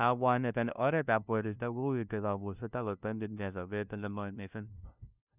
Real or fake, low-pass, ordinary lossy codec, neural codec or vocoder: fake; 3.6 kHz; none; codec, 16 kHz, 0.5 kbps, FunCodec, trained on LibriTTS, 25 frames a second